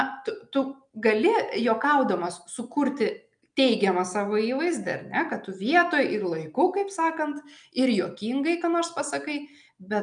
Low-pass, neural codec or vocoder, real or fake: 9.9 kHz; none; real